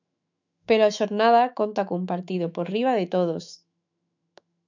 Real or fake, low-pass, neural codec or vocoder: fake; 7.2 kHz; autoencoder, 48 kHz, 128 numbers a frame, DAC-VAE, trained on Japanese speech